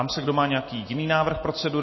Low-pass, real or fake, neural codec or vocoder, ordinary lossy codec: 7.2 kHz; real; none; MP3, 24 kbps